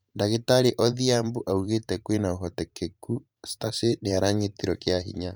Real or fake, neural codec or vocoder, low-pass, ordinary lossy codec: fake; vocoder, 44.1 kHz, 128 mel bands every 512 samples, BigVGAN v2; none; none